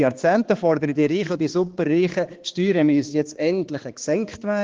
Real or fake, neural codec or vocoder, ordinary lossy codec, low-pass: fake; codec, 16 kHz, 2 kbps, X-Codec, HuBERT features, trained on balanced general audio; Opus, 16 kbps; 7.2 kHz